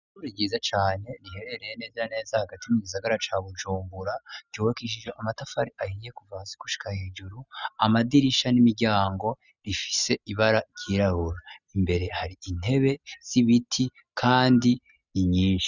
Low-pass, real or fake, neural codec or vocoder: 7.2 kHz; real; none